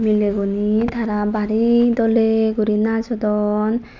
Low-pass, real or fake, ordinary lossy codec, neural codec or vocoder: 7.2 kHz; real; none; none